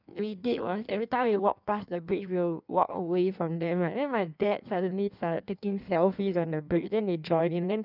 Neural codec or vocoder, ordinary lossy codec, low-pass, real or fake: codec, 16 kHz in and 24 kHz out, 1.1 kbps, FireRedTTS-2 codec; none; 5.4 kHz; fake